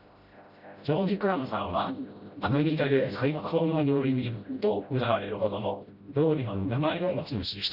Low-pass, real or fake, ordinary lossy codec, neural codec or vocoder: 5.4 kHz; fake; Opus, 64 kbps; codec, 16 kHz, 0.5 kbps, FreqCodec, smaller model